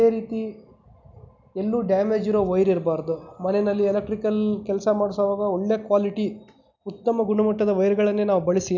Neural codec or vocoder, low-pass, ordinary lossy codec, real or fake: none; 7.2 kHz; none; real